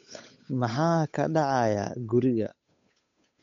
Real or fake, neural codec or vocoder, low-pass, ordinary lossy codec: fake; codec, 16 kHz, 8 kbps, FunCodec, trained on Chinese and English, 25 frames a second; 7.2 kHz; MP3, 48 kbps